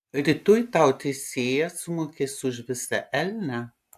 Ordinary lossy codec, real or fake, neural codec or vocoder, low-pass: AAC, 96 kbps; real; none; 14.4 kHz